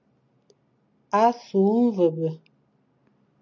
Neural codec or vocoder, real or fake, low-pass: none; real; 7.2 kHz